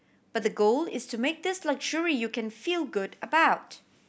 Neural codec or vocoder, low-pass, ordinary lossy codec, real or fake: none; none; none; real